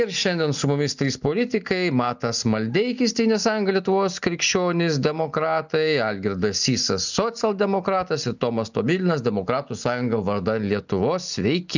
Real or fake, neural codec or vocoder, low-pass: real; none; 7.2 kHz